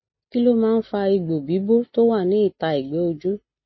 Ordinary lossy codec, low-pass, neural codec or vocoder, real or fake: MP3, 24 kbps; 7.2 kHz; none; real